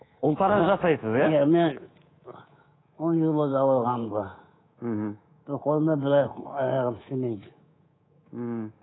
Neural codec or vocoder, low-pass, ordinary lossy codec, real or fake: codec, 24 kHz, 3.1 kbps, DualCodec; 7.2 kHz; AAC, 16 kbps; fake